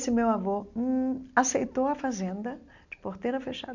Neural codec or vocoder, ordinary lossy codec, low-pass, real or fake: none; none; 7.2 kHz; real